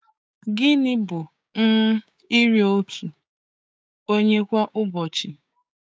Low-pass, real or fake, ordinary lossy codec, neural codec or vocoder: none; fake; none; codec, 16 kHz, 6 kbps, DAC